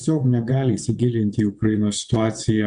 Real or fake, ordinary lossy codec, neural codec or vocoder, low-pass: fake; Opus, 64 kbps; vocoder, 22.05 kHz, 80 mel bands, WaveNeXt; 9.9 kHz